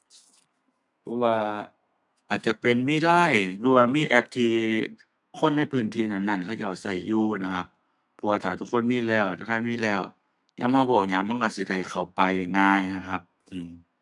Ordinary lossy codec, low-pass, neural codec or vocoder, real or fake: none; 10.8 kHz; codec, 32 kHz, 1.9 kbps, SNAC; fake